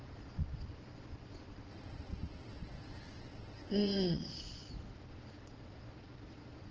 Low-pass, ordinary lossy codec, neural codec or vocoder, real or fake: 7.2 kHz; Opus, 16 kbps; vocoder, 22.05 kHz, 80 mel bands, Vocos; fake